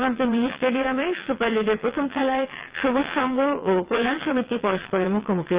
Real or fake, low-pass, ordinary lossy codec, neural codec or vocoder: fake; 3.6 kHz; Opus, 32 kbps; vocoder, 22.05 kHz, 80 mel bands, WaveNeXt